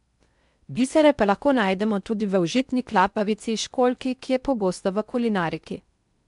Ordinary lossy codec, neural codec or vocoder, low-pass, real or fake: MP3, 96 kbps; codec, 16 kHz in and 24 kHz out, 0.6 kbps, FocalCodec, streaming, 4096 codes; 10.8 kHz; fake